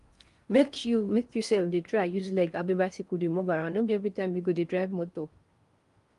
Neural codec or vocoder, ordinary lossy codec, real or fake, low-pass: codec, 16 kHz in and 24 kHz out, 0.6 kbps, FocalCodec, streaming, 4096 codes; Opus, 32 kbps; fake; 10.8 kHz